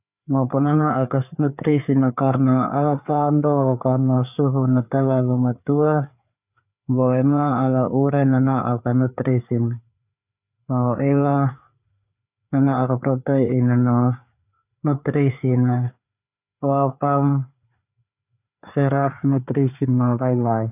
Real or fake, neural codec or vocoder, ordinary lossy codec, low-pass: fake; codec, 16 kHz, 4 kbps, FreqCodec, larger model; none; 3.6 kHz